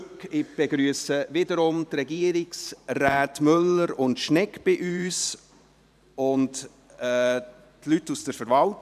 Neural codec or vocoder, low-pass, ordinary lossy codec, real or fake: vocoder, 44.1 kHz, 128 mel bands every 512 samples, BigVGAN v2; 14.4 kHz; none; fake